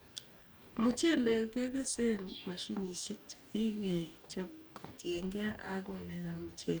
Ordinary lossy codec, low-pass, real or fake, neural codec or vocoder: none; none; fake; codec, 44.1 kHz, 2.6 kbps, DAC